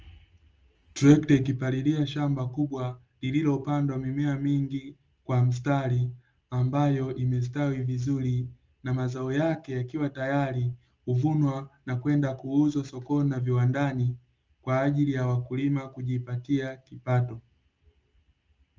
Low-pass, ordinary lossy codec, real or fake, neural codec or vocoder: 7.2 kHz; Opus, 24 kbps; real; none